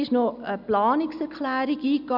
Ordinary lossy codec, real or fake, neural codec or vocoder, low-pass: none; real; none; 5.4 kHz